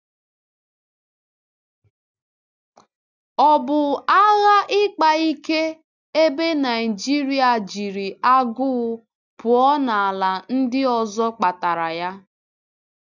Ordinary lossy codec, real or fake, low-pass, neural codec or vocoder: none; real; 7.2 kHz; none